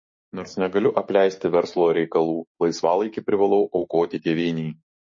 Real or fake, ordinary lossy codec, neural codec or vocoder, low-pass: fake; MP3, 32 kbps; codec, 16 kHz, 6 kbps, DAC; 7.2 kHz